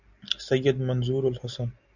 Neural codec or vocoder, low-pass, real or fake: none; 7.2 kHz; real